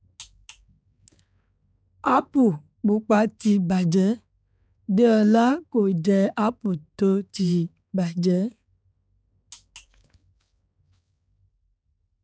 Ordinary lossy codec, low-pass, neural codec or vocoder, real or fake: none; none; codec, 16 kHz, 4 kbps, X-Codec, HuBERT features, trained on balanced general audio; fake